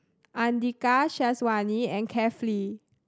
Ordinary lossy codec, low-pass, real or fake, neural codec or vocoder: none; none; real; none